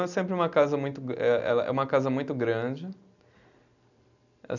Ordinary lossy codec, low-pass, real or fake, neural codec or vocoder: none; 7.2 kHz; real; none